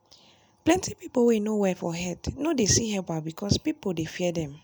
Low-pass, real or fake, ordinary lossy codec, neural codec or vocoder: none; real; none; none